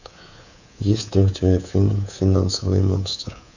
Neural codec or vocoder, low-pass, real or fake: codec, 24 kHz, 3.1 kbps, DualCodec; 7.2 kHz; fake